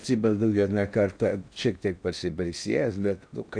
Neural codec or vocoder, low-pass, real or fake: codec, 16 kHz in and 24 kHz out, 0.6 kbps, FocalCodec, streaming, 4096 codes; 9.9 kHz; fake